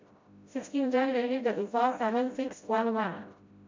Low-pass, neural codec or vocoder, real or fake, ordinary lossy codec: 7.2 kHz; codec, 16 kHz, 0.5 kbps, FreqCodec, smaller model; fake; MP3, 48 kbps